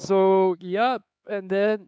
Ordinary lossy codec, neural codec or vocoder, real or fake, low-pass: none; codec, 16 kHz, 4 kbps, X-Codec, HuBERT features, trained on LibriSpeech; fake; none